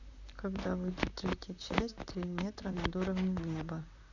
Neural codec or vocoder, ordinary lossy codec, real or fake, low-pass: vocoder, 44.1 kHz, 128 mel bands, Pupu-Vocoder; none; fake; 7.2 kHz